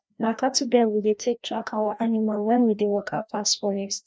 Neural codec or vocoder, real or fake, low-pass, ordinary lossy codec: codec, 16 kHz, 1 kbps, FreqCodec, larger model; fake; none; none